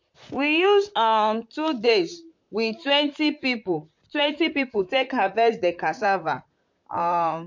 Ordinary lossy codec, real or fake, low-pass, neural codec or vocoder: MP3, 48 kbps; fake; 7.2 kHz; vocoder, 44.1 kHz, 128 mel bands, Pupu-Vocoder